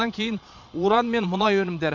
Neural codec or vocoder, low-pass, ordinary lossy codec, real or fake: vocoder, 22.05 kHz, 80 mel bands, Vocos; 7.2 kHz; MP3, 48 kbps; fake